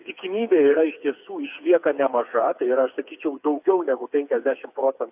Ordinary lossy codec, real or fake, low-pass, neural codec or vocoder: AAC, 32 kbps; fake; 3.6 kHz; codec, 16 kHz, 4 kbps, FreqCodec, smaller model